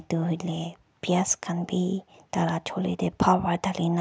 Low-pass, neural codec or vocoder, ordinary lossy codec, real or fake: none; none; none; real